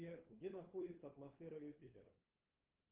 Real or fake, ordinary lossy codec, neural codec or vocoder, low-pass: fake; Opus, 16 kbps; codec, 16 kHz, 8 kbps, FunCodec, trained on LibriTTS, 25 frames a second; 3.6 kHz